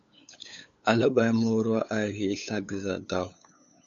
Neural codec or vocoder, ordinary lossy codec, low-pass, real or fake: codec, 16 kHz, 8 kbps, FunCodec, trained on LibriTTS, 25 frames a second; MP3, 48 kbps; 7.2 kHz; fake